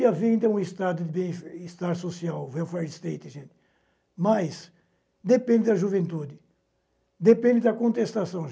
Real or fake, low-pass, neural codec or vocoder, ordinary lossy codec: real; none; none; none